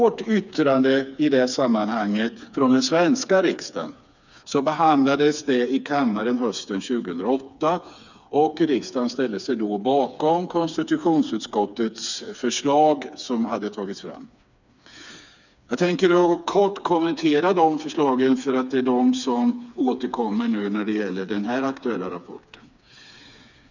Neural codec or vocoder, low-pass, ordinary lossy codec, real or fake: codec, 16 kHz, 4 kbps, FreqCodec, smaller model; 7.2 kHz; none; fake